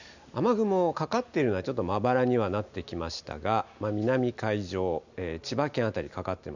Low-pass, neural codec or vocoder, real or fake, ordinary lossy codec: 7.2 kHz; none; real; none